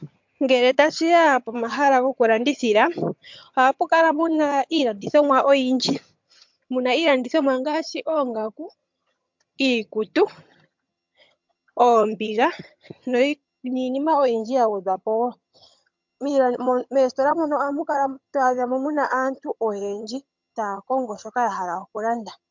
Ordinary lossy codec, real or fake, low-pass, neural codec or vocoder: MP3, 64 kbps; fake; 7.2 kHz; vocoder, 22.05 kHz, 80 mel bands, HiFi-GAN